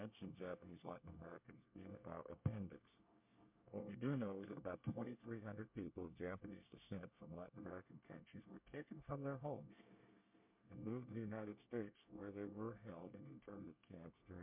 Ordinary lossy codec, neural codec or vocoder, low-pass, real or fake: MP3, 32 kbps; codec, 24 kHz, 1 kbps, SNAC; 3.6 kHz; fake